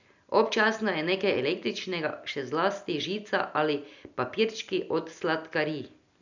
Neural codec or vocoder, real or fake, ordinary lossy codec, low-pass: none; real; none; 7.2 kHz